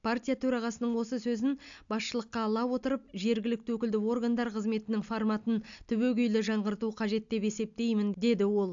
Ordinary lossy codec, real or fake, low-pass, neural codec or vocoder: AAC, 64 kbps; real; 7.2 kHz; none